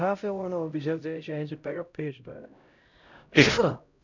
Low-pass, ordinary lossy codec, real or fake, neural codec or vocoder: 7.2 kHz; none; fake; codec, 16 kHz, 0.5 kbps, X-Codec, HuBERT features, trained on LibriSpeech